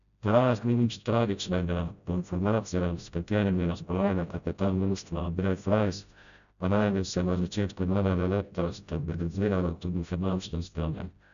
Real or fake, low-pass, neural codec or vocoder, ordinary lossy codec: fake; 7.2 kHz; codec, 16 kHz, 0.5 kbps, FreqCodec, smaller model; none